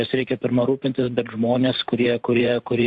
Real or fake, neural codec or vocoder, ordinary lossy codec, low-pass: fake; vocoder, 44.1 kHz, 128 mel bands every 512 samples, BigVGAN v2; AAC, 64 kbps; 10.8 kHz